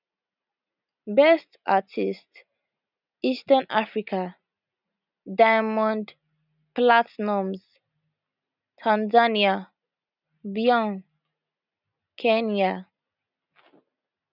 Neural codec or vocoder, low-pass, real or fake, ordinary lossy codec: none; 5.4 kHz; real; none